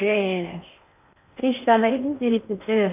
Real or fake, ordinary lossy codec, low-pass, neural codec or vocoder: fake; AAC, 32 kbps; 3.6 kHz; codec, 16 kHz in and 24 kHz out, 0.6 kbps, FocalCodec, streaming, 4096 codes